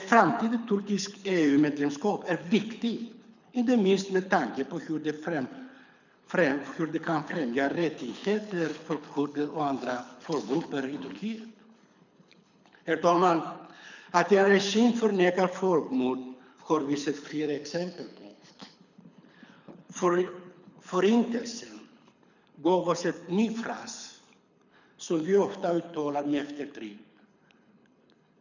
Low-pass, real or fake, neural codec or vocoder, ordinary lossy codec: 7.2 kHz; fake; codec, 24 kHz, 6 kbps, HILCodec; none